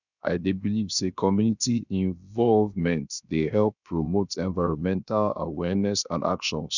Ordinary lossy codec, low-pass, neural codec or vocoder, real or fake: none; 7.2 kHz; codec, 16 kHz, 0.7 kbps, FocalCodec; fake